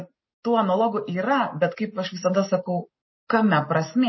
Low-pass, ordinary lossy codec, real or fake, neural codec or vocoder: 7.2 kHz; MP3, 24 kbps; real; none